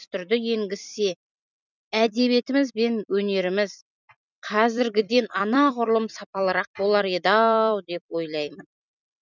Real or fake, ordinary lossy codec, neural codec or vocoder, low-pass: fake; none; vocoder, 44.1 kHz, 128 mel bands every 256 samples, BigVGAN v2; 7.2 kHz